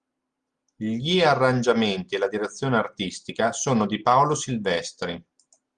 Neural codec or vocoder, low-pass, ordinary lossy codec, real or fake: none; 9.9 kHz; Opus, 32 kbps; real